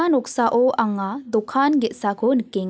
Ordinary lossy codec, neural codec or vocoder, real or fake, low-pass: none; none; real; none